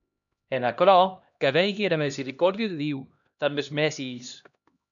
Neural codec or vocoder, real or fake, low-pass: codec, 16 kHz, 1 kbps, X-Codec, HuBERT features, trained on LibriSpeech; fake; 7.2 kHz